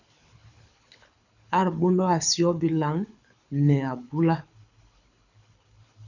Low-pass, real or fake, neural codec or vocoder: 7.2 kHz; fake; codec, 24 kHz, 6 kbps, HILCodec